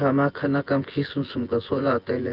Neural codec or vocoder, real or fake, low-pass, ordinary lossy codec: vocoder, 24 kHz, 100 mel bands, Vocos; fake; 5.4 kHz; Opus, 32 kbps